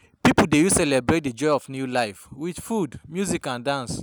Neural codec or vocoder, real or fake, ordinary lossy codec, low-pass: none; real; none; none